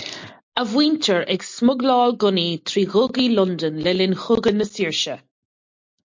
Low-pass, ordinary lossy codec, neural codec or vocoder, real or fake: 7.2 kHz; MP3, 48 kbps; none; real